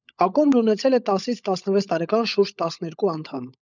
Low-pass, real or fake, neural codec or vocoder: 7.2 kHz; fake; codec, 16 kHz, 16 kbps, FunCodec, trained on LibriTTS, 50 frames a second